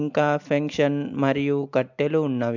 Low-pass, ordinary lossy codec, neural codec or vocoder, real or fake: 7.2 kHz; MP3, 64 kbps; none; real